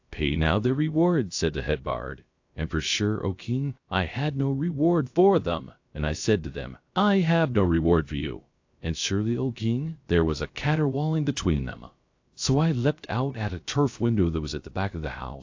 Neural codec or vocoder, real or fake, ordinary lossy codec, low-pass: codec, 16 kHz, 0.3 kbps, FocalCodec; fake; AAC, 48 kbps; 7.2 kHz